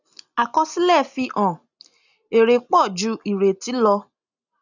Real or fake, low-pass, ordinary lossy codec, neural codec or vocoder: real; 7.2 kHz; none; none